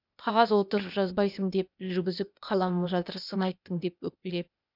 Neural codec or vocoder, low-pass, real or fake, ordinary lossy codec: codec, 16 kHz, 0.8 kbps, ZipCodec; 5.4 kHz; fake; none